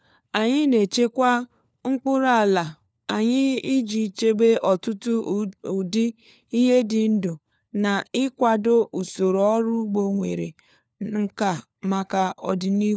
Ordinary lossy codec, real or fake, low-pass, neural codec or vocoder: none; fake; none; codec, 16 kHz, 4 kbps, FunCodec, trained on LibriTTS, 50 frames a second